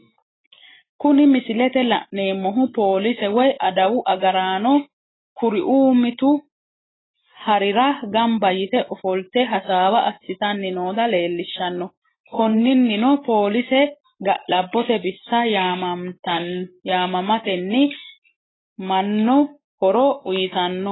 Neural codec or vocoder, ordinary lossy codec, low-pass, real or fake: none; AAC, 16 kbps; 7.2 kHz; real